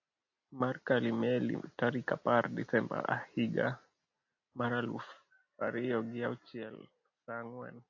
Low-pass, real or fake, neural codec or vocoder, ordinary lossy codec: 7.2 kHz; real; none; AAC, 48 kbps